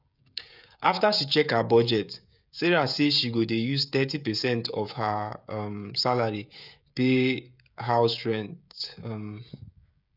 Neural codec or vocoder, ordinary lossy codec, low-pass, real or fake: codec, 16 kHz, 16 kbps, FreqCodec, smaller model; none; 5.4 kHz; fake